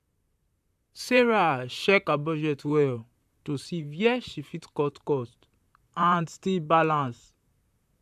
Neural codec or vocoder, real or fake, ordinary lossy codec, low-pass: vocoder, 44.1 kHz, 128 mel bands, Pupu-Vocoder; fake; AAC, 96 kbps; 14.4 kHz